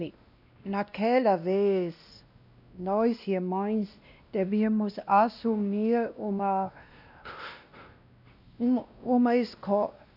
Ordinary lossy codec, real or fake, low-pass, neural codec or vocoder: none; fake; 5.4 kHz; codec, 16 kHz, 1 kbps, X-Codec, WavLM features, trained on Multilingual LibriSpeech